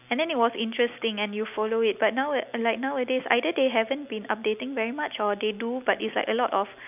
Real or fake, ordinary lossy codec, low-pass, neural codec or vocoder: real; none; 3.6 kHz; none